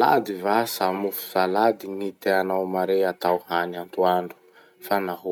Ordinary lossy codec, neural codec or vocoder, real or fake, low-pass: none; none; real; none